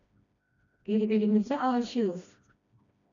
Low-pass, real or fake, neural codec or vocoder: 7.2 kHz; fake; codec, 16 kHz, 1 kbps, FreqCodec, smaller model